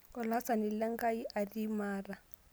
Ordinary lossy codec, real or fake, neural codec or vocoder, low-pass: none; real; none; none